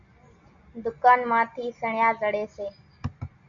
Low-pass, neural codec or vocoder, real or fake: 7.2 kHz; none; real